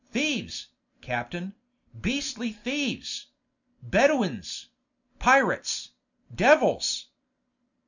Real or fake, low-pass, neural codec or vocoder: real; 7.2 kHz; none